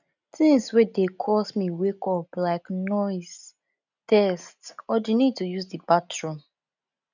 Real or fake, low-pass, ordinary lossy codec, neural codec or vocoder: real; 7.2 kHz; none; none